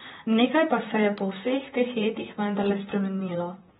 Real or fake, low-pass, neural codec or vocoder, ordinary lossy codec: fake; 19.8 kHz; vocoder, 44.1 kHz, 128 mel bands, Pupu-Vocoder; AAC, 16 kbps